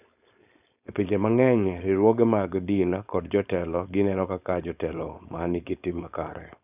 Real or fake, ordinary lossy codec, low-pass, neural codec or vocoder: fake; none; 3.6 kHz; codec, 16 kHz, 4.8 kbps, FACodec